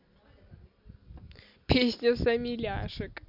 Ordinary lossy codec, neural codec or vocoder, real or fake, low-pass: MP3, 48 kbps; none; real; 5.4 kHz